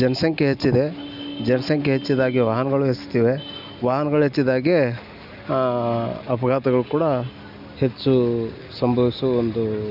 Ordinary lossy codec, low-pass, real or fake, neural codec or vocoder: none; 5.4 kHz; real; none